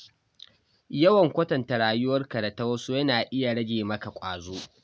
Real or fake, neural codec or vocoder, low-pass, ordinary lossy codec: real; none; none; none